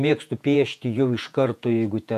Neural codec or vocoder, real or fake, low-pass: vocoder, 48 kHz, 128 mel bands, Vocos; fake; 14.4 kHz